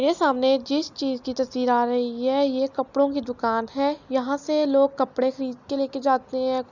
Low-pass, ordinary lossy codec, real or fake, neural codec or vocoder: 7.2 kHz; none; real; none